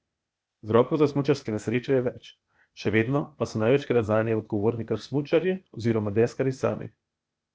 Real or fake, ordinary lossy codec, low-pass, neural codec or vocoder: fake; none; none; codec, 16 kHz, 0.8 kbps, ZipCodec